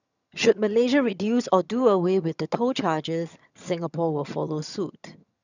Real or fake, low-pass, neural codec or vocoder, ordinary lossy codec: fake; 7.2 kHz; vocoder, 22.05 kHz, 80 mel bands, HiFi-GAN; none